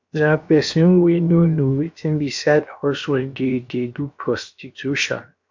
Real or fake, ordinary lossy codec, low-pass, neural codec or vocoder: fake; MP3, 64 kbps; 7.2 kHz; codec, 16 kHz, 0.7 kbps, FocalCodec